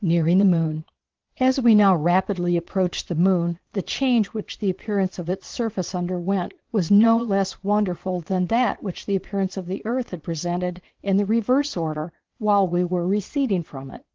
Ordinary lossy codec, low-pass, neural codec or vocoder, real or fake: Opus, 16 kbps; 7.2 kHz; vocoder, 22.05 kHz, 80 mel bands, Vocos; fake